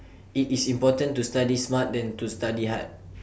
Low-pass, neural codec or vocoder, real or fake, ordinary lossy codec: none; none; real; none